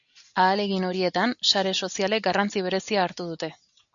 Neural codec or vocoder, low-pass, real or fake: none; 7.2 kHz; real